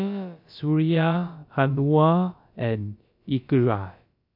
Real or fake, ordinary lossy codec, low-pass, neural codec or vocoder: fake; MP3, 48 kbps; 5.4 kHz; codec, 16 kHz, about 1 kbps, DyCAST, with the encoder's durations